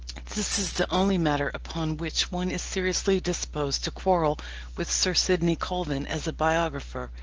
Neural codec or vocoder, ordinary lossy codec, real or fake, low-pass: none; Opus, 16 kbps; real; 7.2 kHz